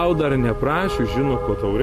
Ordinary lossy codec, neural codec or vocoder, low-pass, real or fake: MP3, 64 kbps; none; 14.4 kHz; real